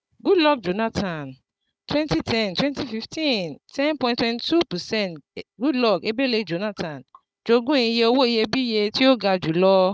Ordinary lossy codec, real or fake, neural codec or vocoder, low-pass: none; fake; codec, 16 kHz, 16 kbps, FunCodec, trained on Chinese and English, 50 frames a second; none